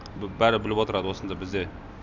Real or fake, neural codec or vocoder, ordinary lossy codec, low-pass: real; none; none; 7.2 kHz